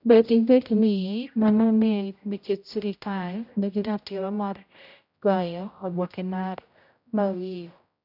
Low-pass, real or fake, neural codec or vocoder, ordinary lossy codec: 5.4 kHz; fake; codec, 16 kHz, 0.5 kbps, X-Codec, HuBERT features, trained on general audio; none